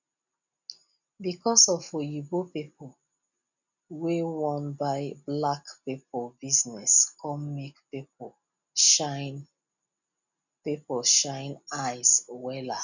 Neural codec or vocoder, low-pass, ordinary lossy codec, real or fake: none; 7.2 kHz; none; real